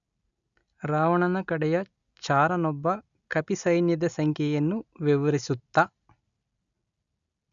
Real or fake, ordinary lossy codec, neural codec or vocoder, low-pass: real; MP3, 96 kbps; none; 7.2 kHz